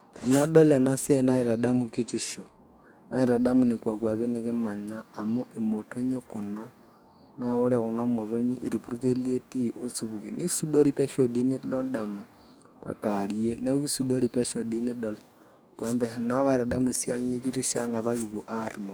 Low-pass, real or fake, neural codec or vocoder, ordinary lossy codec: none; fake; codec, 44.1 kHz, 2.6 kbps, DAC; none